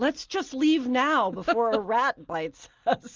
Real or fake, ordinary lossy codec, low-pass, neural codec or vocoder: real; Opus, 32 kbps; 7.2 kHz; none